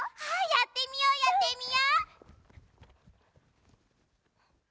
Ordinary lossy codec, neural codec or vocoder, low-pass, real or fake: none; none; none; real